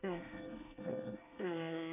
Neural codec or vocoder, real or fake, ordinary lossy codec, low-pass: codec, 24 kHz, 1 kbps, SNAC; fake; none; 3.6 kHz